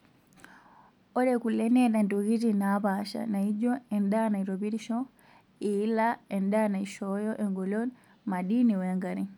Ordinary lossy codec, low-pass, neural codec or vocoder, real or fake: none; 19.8 kHz; none; real